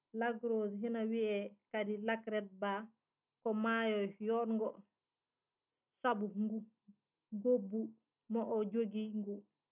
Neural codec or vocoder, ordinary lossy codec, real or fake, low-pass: none; none; real; 3.6 kHz